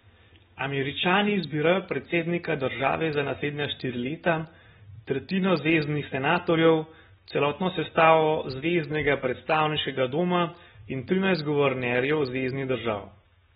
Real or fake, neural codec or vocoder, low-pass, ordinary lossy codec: real; none; 19.8 kHz; AAC, 16 kbps